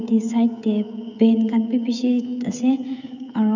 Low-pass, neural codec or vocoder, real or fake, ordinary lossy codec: 7.2 kHz; codec, 16 kHz, 16 kbps, FreqCodec, smaller model; fake; none